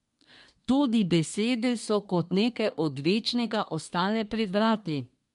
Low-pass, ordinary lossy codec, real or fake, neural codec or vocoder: 10.8 kHz; MP3, 64 kbps; fake; codec, 24 kHz, 1 kbps, SNAC